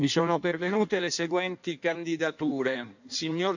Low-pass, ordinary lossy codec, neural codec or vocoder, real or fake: 7.2 kHz; none; codec, 16 kHz in and 24 kHz out, 1.1 kbps, FireRedTTS-2 codec; fake